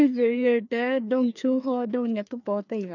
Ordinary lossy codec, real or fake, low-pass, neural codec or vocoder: none; fake; 7.2 kHz; codec, 16 kHz, 2 kbps, FreqCodec, larger model